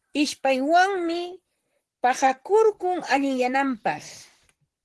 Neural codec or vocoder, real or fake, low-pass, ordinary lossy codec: vocoder, 44.1 kHz, 128 mel bands, Pupu-Vocoder; fake; 10.8 kHz; Opus, 16 kbps